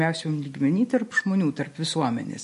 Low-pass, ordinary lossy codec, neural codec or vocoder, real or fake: 14.4 kHz; MP3, 48 kbps; none; real